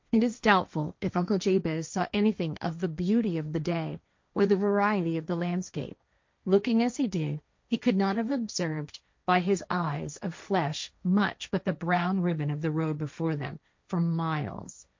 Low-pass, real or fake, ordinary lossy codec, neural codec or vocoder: 7.2 kHz; fake; MP3, 48 kbps; codec, 16 kHz, 1.1 kbps, Voila-Tokenizer